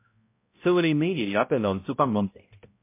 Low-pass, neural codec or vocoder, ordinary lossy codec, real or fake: 3.6 kHz; codec, 16 kHz, 0.5 kbps, X-Codec, HuBERT features, trained on balanced general audio; MP3, 24 kbps; fake